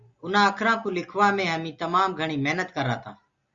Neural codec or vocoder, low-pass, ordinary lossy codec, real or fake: none; 7.2 kHz; Opus, 64 kbps; real